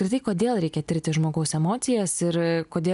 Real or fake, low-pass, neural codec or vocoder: real; 10.8 kHz; none